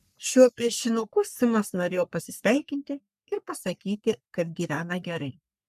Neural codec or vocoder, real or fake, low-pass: codec, 44.1 kHz, 3.4 kbps, Pupu-Codec; fake; 14.4 kHz